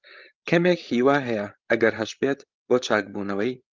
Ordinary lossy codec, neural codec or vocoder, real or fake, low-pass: Opus, 32 kbps; codec, 16 kHz, 16 kbps, FreqCodec, larger model; fake; 7.2 kHz